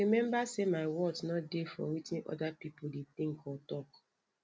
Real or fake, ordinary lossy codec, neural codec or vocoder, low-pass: real; none; none; none